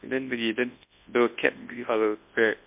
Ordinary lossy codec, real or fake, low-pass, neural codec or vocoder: MP3, 32 kbps; fake; 3.6 kHz; codec, 24 kHz, 0.9 kbps, WavTokenizer, large speech release